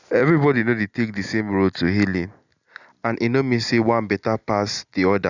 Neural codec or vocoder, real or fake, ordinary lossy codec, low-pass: none; real; none; 7.2 kHz